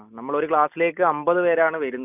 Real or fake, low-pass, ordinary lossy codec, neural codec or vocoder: real; 3.6 kHz; none; none